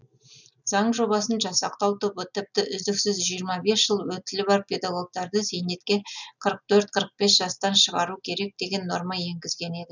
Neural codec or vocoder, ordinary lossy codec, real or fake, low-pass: none; none; real; 7.2 kHz